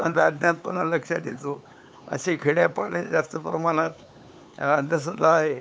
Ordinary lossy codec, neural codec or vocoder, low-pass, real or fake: none; codec, 16 kHz, 4 kbps, X-Codec, HuBERT features, trained on LibriSpeech; none; fake